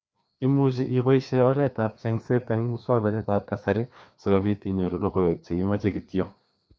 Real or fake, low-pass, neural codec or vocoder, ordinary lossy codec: fake; none; codec, 16 kHz, 2 kbps, FreqCodec, larger model; none